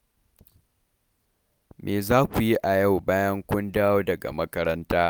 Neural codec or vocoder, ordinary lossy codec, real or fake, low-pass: none; none; real; none